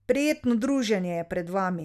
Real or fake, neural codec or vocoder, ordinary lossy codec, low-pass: real; none; none; 14.4 kHz